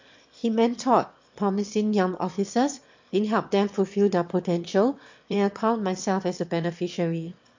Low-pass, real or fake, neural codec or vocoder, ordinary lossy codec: 7.2 kHz; fake; autoencoder, 22.05 kHz, a latent of 192 numbers a frame, VITS, trained on one speaker; MP3, 48 kbps